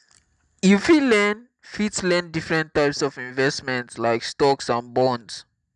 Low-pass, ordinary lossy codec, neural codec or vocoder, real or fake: 10.8 kHz; none; none; real